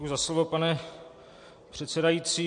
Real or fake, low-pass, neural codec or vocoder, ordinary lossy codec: real; 9.9 kHz; none; MP3, 48 kbps